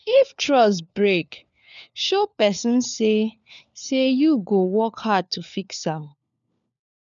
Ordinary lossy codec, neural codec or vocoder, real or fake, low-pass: MP3, 96 kbps; codec, 16 kHz, 4 kbps, FunCodec, trained on LibriTTS, 50 frames a second; fake; 7.2 kHz